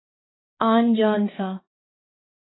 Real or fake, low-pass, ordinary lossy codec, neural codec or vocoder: fake; 7.2 kHz; AAC, 16 kbps; codec, 16 kHz, 2 kbps, X-Codec, HuBERT features, trained on balanced general audio